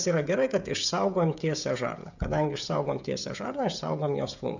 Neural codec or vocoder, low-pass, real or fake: codec, 16 kHz, 16 kbps, FreqCodec, smaller model; 7.2 kHz; fake